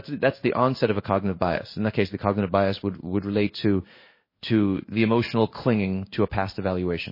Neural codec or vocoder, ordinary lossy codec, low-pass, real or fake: codec, 16 kHz in and 24 kHz out, 1 kbps, XY-Tokenizer; MP3, 24 kbps; 5.4 kHz; fake